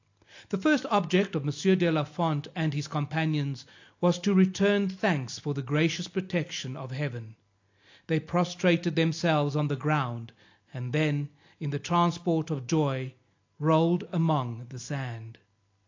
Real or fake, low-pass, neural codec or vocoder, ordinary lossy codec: real; 7.2 kHz; none; AAC, 48 kbps